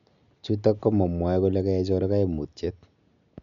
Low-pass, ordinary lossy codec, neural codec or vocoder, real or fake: 7.2 kHz; MP3, 96 kbps; none; real